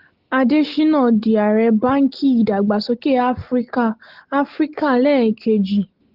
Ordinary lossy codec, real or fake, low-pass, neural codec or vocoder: Opus, 16 kbps; real; 5.4 kHz; none